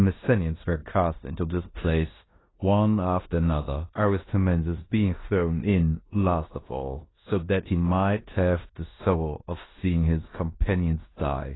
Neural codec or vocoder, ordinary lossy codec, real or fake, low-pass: codec, 16 kHz in and 24 kHz out, 0.9 kbps, LongCat-Audio-Codec, four codebook decoder; AAC, 16 kbps; fake; 7.2 kHz